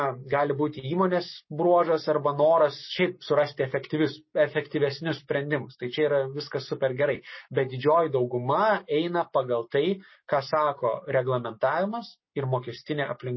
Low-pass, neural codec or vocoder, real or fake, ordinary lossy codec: 7.2 kHz; none; real; MP3, 24 kbps